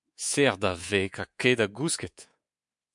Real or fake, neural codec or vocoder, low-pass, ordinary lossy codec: fake; codec, 24 kHz, 3.1 kbps, DualCodec; 10.8 kHz; MP3, 64 kbps